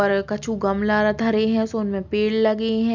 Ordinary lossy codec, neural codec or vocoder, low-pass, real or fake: Opus, 64 kbps; none; 7.2 kHz; real